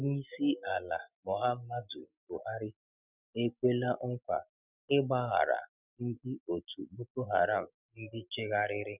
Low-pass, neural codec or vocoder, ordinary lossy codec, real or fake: 3.6 kHz; none; none; real